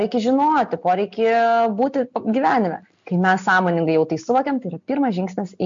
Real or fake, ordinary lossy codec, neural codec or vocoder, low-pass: real; MP3, 48 kbps; none; 7.2 kHz